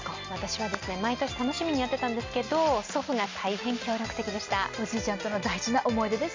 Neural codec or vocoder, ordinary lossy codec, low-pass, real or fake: none; MP3, 64 kbps; 7.2 kHz; real